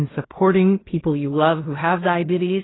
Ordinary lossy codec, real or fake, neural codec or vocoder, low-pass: AAC, 16 kbps; fake; codec, 16 kHz in and 24 kHz out, 0.4 kbps, LongCat-Audio-Codec, fine tuned four codebook decoder; 7.2 kHz